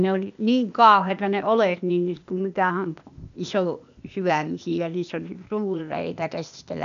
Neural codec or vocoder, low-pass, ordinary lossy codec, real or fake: codec, 16 kHz, 0.8 kbps, ZipCodec; 7.2 kHz; none; fake